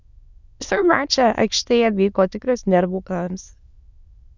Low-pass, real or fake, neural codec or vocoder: 7.2 kHz; fake; autoencoder, 22.05 kHz, a latent of 192 numbers a frame, VITS, trained on many speakers